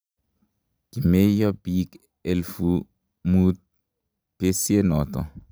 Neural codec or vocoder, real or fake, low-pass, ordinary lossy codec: none; real; none; none